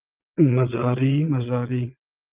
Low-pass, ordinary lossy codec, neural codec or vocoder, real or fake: 3.6 kHz; Opus, 32 kbps; vocoder, 22.05 kHz, 80 mel bands, Vocos; fake